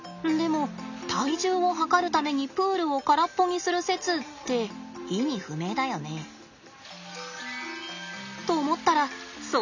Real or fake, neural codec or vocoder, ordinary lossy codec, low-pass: real; none; none; 7.2 kHz